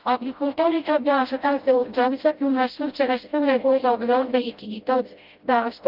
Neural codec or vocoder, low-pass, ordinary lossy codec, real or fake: codec, 16 kHz, 0.5 kbps, FreqCodec, smaller model; 5.4 kHz; Opus, 32 kbps; fake